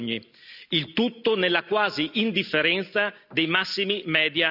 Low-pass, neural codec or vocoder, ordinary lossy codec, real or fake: 5.4 kHz; none; none; real